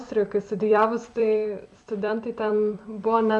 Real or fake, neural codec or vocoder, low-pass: fake; vocoder, 44.1 kHz, 128 mel bands every 256 samples, BigVGAN v2; 10.8 kHz